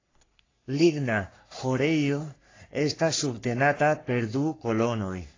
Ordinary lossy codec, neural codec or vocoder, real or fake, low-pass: AAC, 32 kbps; codec, 44.1 kHz, 3.4 kbps, Pupu-Codec; fake; 7.2 kHz